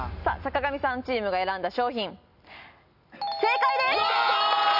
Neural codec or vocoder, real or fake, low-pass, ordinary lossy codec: none; real; 5.4 kHz; none